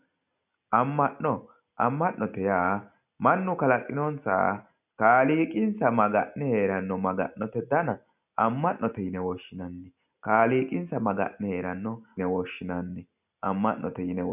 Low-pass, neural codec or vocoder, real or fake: 3.6 kHz; none; real